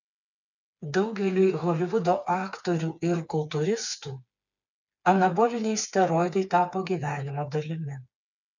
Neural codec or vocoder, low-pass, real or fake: codec, 16 kHz, 4 kbps, FreqCodec, smaller model; 7.2 kHz; fake